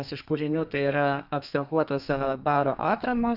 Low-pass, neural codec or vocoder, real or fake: 5.4 kHz; codec, 32 kHz, 1.9 kbps, SNAC; fake